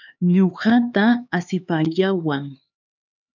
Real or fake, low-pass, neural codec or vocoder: fake; 7.2 kHz; codec, 16 kHz, 4 kbps, X-Codec, HuBERT features, trained on LibriSpeech